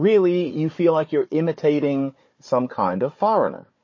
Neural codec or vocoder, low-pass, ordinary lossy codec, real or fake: codec, 16 kHz, 4 kbps, FunCodec, trained on Chinese and English, 50 frames a second; 7.2 kHz; MP3, 32 kbps; fake